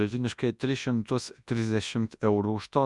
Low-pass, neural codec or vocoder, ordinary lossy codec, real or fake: 10.8 kHz; codec, 24 kHz, 0.9 kbps, WavTokenizer, large speech release; Opus, 64 kbps; fake